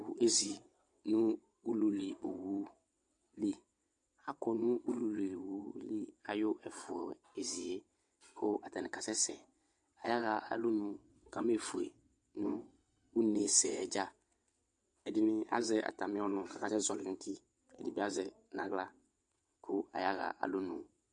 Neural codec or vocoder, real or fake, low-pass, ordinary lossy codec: vocoder, 22.05 kHz, 80 mel bands, WaveNeXt; fake; 9.9 kHz; MP3, 64 kbps